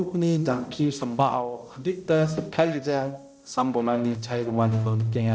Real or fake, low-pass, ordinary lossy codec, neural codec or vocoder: fake; none; none; codec, 16 kHz, 0.5 kbps, X-Codec, HuBERT features, trained on balanced general audio